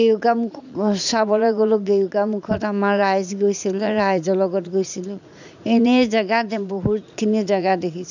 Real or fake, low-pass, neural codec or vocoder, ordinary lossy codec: fake; 7.2 kHz; vocoder, 44.1 kHz, 80 mel bands, Vocos; none